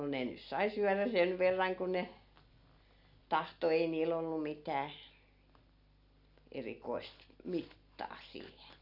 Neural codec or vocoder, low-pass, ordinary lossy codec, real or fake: none; 5.4 kHz; none; real